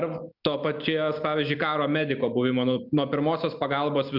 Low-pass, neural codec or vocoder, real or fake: 5.4 kHz; none; real